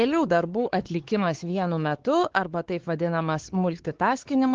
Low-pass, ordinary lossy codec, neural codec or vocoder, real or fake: 7.2 kHz; Opus, 16 kbps; codec, 16 kHz, 4 kbps, X-Codec, WavLM features, trained on Multilingual LibriSpeech; fake